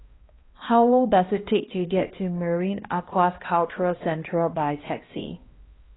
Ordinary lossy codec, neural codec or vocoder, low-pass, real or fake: AAC, 16 kbps; codec, 16 kHz, 1 kbps, X-Codec, HuBERT features, trained on balanced general audio; 7.2 kHz; fake